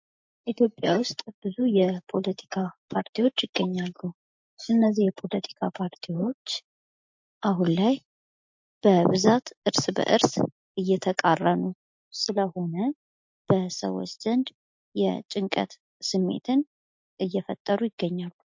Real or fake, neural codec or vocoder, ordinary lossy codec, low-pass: real; none; MP3, 48 kbps; 7.2 kHz